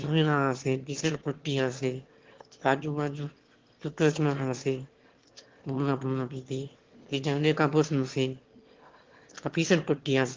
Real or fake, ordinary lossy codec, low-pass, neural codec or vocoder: fake; Opus, 16 kbps; 7.2 kHz; autoencoder, 22.05 kHz, a latent of 192 numbers a frame, VITS, trained on one speaker